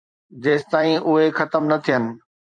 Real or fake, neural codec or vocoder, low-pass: fake; vocoder, 44.1 kHz, 128 mel bands every 256 samples, BigVGAN v2; 9.9 kHz